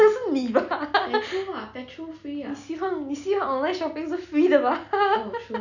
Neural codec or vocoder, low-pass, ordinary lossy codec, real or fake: none; 7.2 kHz; none; real